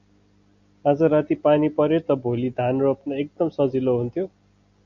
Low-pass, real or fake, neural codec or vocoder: 7.2 kHz; real; none